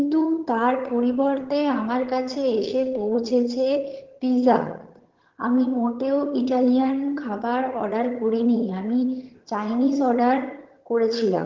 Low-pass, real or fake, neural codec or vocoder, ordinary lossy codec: 7.2 kHz; fake; vocoder, 22.05 kHz, 80 mel bands, HiFi-GAN; Opus, 16 kbps